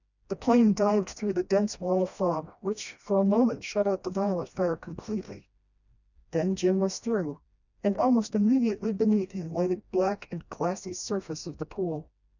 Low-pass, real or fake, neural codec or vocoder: 7.2 kHz; fake; codec, 16 kHz, 1 kbps, FreqCodec, smaller model